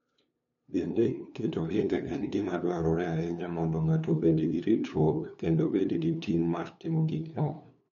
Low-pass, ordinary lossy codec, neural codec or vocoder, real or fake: 7.2 kHz; MP3, 64 kbps; codec, 16 kHz, 2 kbps, FunCodec, trained on LibriTTS, 25 frames a second; fake